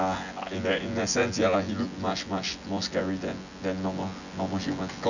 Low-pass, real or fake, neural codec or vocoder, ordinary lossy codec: 7.2 kHz; fake; vocoder, 24 kHz, 100 mel bands, Vocos; none